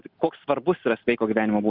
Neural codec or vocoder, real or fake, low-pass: none; real; 5.4 kHz